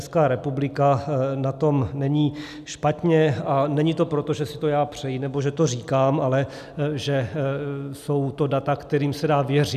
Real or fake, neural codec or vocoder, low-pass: real; none; 14.4 kHz